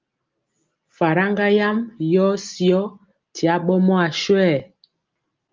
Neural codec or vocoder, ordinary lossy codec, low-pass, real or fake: none; Opus, 32 kbps; 7.2 kHz; real